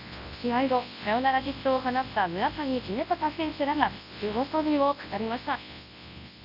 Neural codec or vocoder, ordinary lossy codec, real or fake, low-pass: codec, 24 kHz, 0.9 kbps, WavTokenizer, large speech release; AAC, 48 kbps; fake; 5.4 kHz